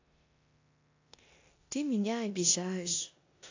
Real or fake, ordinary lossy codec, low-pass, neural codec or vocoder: fake; AAC, 48 kbps; 7.2 kHz; codec, 16 kHz in and 24 kHz out, 0.9 kbps, LongCat-Audio-Codec, four codebook decoder